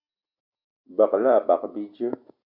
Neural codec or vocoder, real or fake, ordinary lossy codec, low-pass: none; real; MP3, 48 kbps; 5.4 kHz